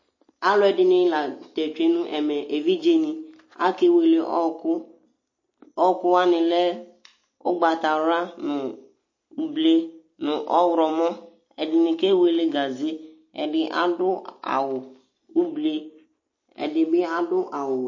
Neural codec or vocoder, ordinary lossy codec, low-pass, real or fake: none; MP3, 32 kbps; 7.2 kHz; real